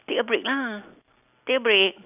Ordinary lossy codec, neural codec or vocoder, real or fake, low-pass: none; none; real; 3.6 kHz